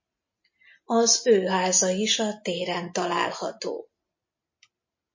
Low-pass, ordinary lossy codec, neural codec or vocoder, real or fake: 7.2 kHz; MP3, 32 kbps; none; real